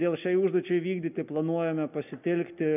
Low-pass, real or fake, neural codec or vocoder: 3.6 kHz; real; none